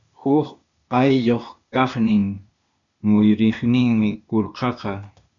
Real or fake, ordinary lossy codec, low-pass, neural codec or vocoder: fake; MP3, 96 kbps; 7.2 kHz; codec, 16 kHz, 0.8 kbps, ZipCodec